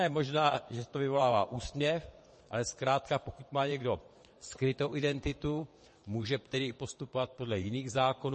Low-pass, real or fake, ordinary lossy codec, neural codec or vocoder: 9.9 kHz; fake; MP3, 32 kbps; vocoder, 22.05 kHz, 80 mel bands, WaveNeXt